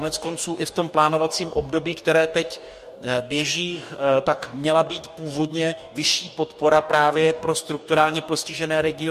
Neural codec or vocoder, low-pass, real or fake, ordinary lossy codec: codec, 44.1 kHz, 2.6 kbps, DAC; 14.4 kHz; fake; MP3, 64 kbps